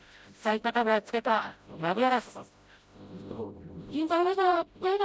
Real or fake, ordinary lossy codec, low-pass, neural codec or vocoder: fake; none; none; codec, 16 kHz, 0.5 kbps, FreqCodec, smaller model